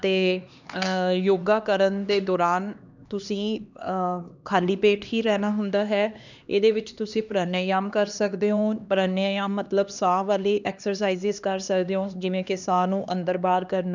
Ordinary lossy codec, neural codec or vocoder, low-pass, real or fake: none; codec, 16 kHz, 2 kbps, X-Codec, HuBERT features, trained on LibriSpeech; 7.2 kHz; fake